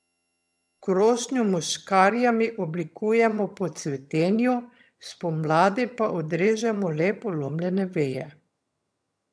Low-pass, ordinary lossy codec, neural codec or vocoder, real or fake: none; none; vocoder, 22.05 kHz, 80 mel bands, HiFi-GAN; fake